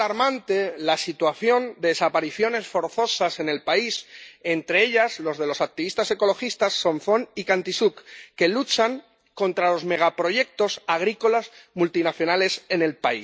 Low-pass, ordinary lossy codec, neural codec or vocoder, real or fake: none; none; none; real